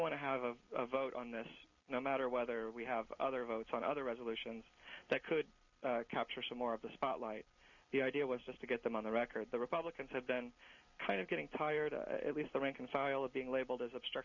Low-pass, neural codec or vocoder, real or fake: 7.2 kHz; none; real